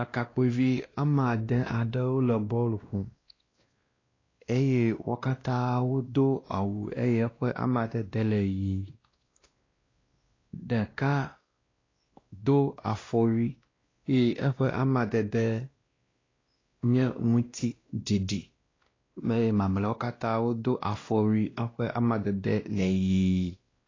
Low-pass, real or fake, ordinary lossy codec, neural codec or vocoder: 7.2 kHz; fake; AAC, 32 kbps; codec, 16 kHz, 1 kbps, X-Codec, WavLM features, trained on Multilingual LibriSpeech